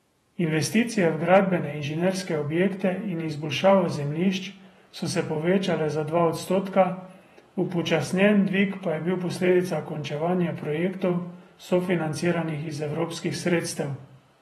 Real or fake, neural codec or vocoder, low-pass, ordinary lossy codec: fake; vocoder, 48 kHz, 128 mel bands, Vocos; 19.8 kHz; AAC, 32 kbps